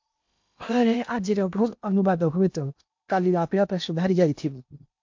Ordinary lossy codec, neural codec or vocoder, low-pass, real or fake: MP3, 48 kbps; codec, 16 kHz in and 24 kHz out, 0.8 kbps, FocalCodec, streaming, 65536 codes; 7.2 kHz; fake